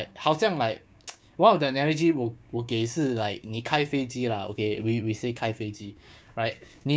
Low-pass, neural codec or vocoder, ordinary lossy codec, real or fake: none; codec, 16 kHz, 6 kbps, DAC; none; fake